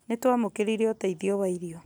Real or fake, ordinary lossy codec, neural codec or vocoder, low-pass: real; none; none; none